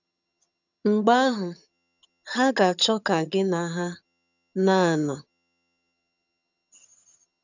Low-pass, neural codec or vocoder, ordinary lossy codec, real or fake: 7.2 kHz; vocoder, 22.05 kHz, 80 mel bands, HiFi-GAN; none; fake